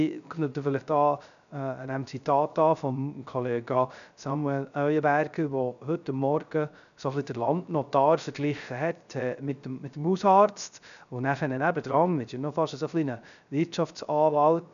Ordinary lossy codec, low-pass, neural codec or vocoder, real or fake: none; 7.2 kHz; codec, 16 kHz, 0.3 kbps, FocalCodec; fake